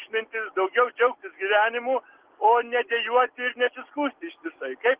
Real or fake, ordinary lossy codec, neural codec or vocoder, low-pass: real; Opus, 16 kbps; none; 3.6 kHz